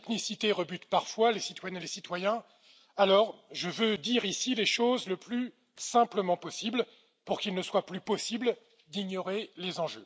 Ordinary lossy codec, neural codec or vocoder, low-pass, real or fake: none; none; none; real